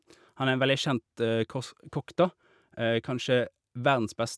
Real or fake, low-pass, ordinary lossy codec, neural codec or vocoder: real; none; none; none